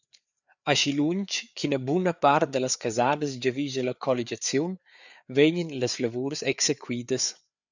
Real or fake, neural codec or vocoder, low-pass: fake; codec, 16 kHz, 4 kbps, FreqCodec, larger model; 7.2 kHz